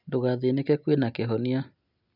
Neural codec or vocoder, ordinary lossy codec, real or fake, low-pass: none; none; real; 5.4 kHz